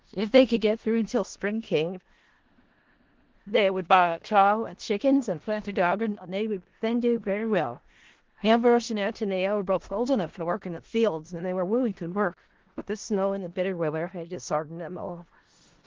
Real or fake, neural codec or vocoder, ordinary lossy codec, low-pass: fake; codec, 16 kHz in and 24 kHz out, 0.4 kbps, LongCat-Audio-Codec, four codebook decoder; Opus, 16 kbps; 7.2 kHz